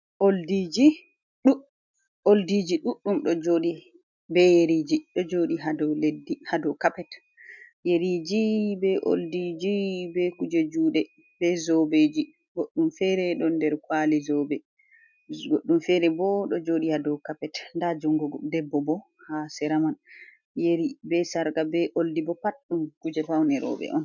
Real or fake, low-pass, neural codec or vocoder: real; 7.2 kHz; none